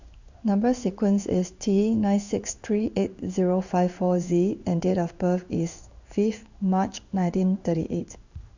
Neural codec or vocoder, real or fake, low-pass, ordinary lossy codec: codec, 16 kHz in and 24 kHz out, 1 kbps, XY-Tokenizer; fake; 7.2 kHz; none